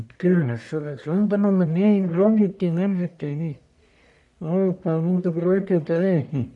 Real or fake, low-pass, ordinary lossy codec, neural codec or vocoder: fake; 10.8 kHz; none; codec, 44.1 kHz, 1.7 kbps, Pupu-Codec